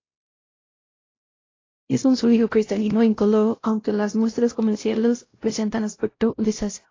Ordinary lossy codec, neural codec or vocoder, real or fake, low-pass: AAC, 32 kbps; codec, 16 kHz, 0.5 kbps, X-Codec, WavLM features, trained on Multilingual LibriSpeech; fake; 7.2 kHz